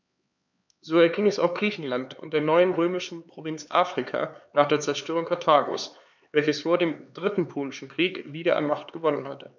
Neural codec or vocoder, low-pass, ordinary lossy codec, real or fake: codec, 16 kHz, 4 kbps, X-Codec, HuBERT features, trained on LibriSpeech; 7.2 kHz; none; fake